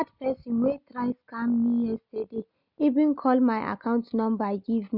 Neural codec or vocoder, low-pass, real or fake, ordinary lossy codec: none; 5.4 kHz; real; none